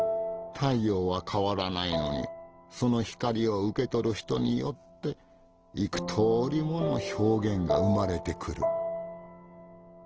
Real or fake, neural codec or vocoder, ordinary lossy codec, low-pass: real; none; Opus, 16 kbps; 7.2 kHz